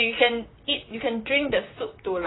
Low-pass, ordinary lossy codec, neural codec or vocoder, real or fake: 7.2 kHz; AAC, 16 kbps; vocoder, 44.1 kHz, 128 mel bands, Pupu-Vocoder; fake